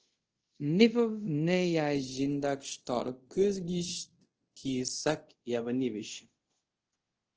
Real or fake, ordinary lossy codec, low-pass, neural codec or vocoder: fake; Opus, 16 kbps; 7.2 kHz; codec, 24 kHz, 0.5 kbps, DualCodec